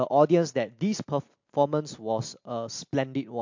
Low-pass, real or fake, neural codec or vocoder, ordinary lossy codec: 7.2 kHz; real; none; MP3, 48 kbps